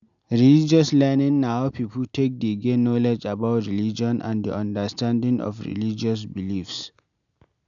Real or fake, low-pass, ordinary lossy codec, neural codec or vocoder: real; 7.2 kHz; none; none